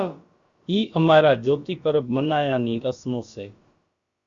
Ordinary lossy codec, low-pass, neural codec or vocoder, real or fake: Opus, 64 kbps; 7.2 kHz; codec, 16 kHz, about 1 kbps, DyCAST, with the encoder's durations; fake